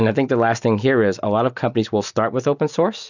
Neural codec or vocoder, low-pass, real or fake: none; 7.2 kHz; real